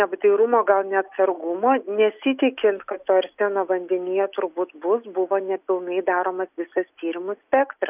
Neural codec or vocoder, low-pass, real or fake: none; 3.6 kHz; real